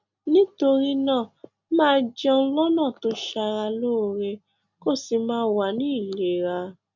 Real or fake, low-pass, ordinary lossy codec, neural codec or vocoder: real; 7.2 kHz; none; none